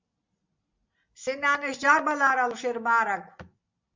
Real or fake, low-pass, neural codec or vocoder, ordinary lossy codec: real; 7.2 kHz; none; MP3, 64 kbps